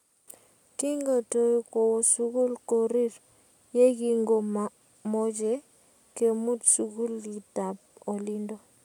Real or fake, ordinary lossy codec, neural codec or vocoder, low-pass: real; none; none; 19.8 kHz